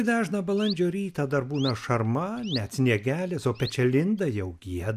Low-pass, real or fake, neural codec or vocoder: 14.4 kHz; real; none